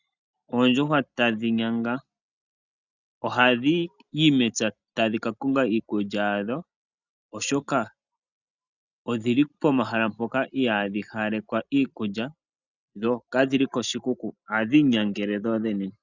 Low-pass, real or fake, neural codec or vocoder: 7.2 kHz; real; none